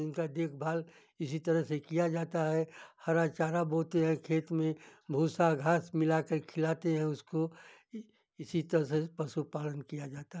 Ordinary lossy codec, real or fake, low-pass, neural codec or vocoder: none; real; none; none